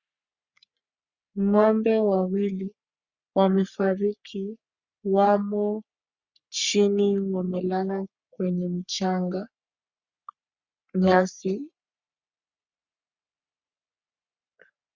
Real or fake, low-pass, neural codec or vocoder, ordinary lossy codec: fake; 7.2 kHz; codec, 44.1 kHz, 3.4 kbps, Pupu-Codec; Opus, 64 kbps